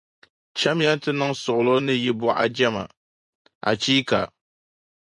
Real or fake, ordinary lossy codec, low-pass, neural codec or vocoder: fake; MP3, 96 kbps; 10.8 kHz; vocoder, 24 kHz, 100 mel bands, Vocos